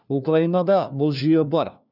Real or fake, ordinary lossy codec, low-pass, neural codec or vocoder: fake; none; 5.4 kHz; codec, 16 kHz, 1 kbps, FunCodec, trained on LibriTTS, 50 frames a second